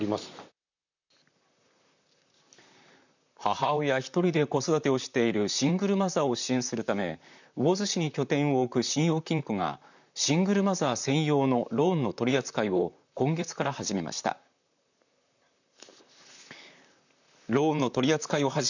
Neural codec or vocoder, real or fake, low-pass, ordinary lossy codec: vocoder, 44.1 kHz, 128 mel bands, Pupu-Vocoder; fake; 7.2 kHz; none